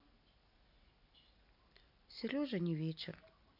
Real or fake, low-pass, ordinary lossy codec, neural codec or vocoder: real; 5.4 kHz; none; none